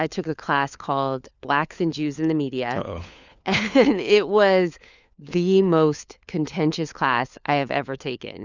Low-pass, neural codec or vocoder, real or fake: 7.2 kHz; codec, 16 kHz, 4 kbps, FunCodec, trained on LibriTTS, 50 frames a second; fake